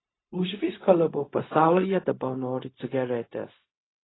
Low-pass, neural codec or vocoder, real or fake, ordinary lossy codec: 7.2 kHz; codec, 16 kHz, 0.4 kbps, LongCat-Audio-Codec; fake; AAC, 16 kbps